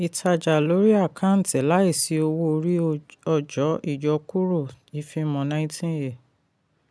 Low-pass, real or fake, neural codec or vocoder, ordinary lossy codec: none; real; none; none